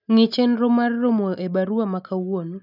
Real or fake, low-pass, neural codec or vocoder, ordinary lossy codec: real; 5.4 kHz; none; none